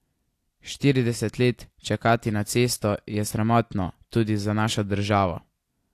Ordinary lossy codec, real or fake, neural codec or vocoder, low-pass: AAC, 64 kbps; real; none; 14.4 kHz